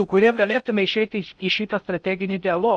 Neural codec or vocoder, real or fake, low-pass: codec, 16 kHz in and 24 kHz out, 0.6 kbps, FocalCodec, streaming, 2048 codes; fake; 9.9 kHz